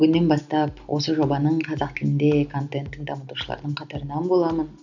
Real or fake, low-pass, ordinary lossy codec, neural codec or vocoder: real; 7.2 kHz; none; none